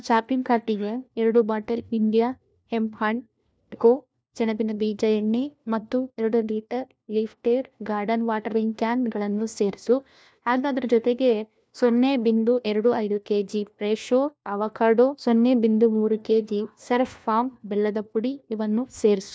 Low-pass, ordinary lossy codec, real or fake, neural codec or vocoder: none; none; fake; codec, 16 kHz, 1 kbps, FunCodec, trained on LibriTTS, 50 frames a second